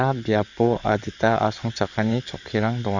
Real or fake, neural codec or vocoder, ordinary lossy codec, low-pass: fake; codec, 16 kHz in and 24 kHz out, 2.2 kbps, FireRedTTS-2 codec; none; 7.2 kHz